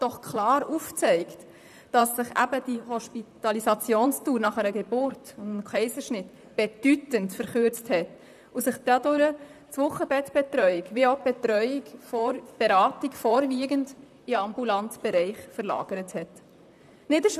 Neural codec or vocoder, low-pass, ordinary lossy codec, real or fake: vocoder, 44.1 kHz, 128 mel bands, Pupu-Vocoder; 14.4 kHz; none; fake